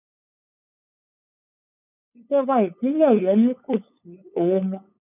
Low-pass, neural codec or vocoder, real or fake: 3.6 kHz; codec, 16 kHz, 8 kbps, FunCodec, trained on LibriTTS, 25 frames a second; fake